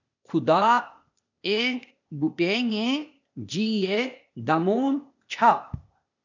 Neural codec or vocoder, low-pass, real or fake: codec, 16 kHz, 0.8 kbps, ZipCodec; 7.2 kHz; fake